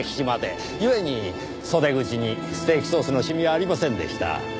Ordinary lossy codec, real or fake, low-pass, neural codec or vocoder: none; real; none; none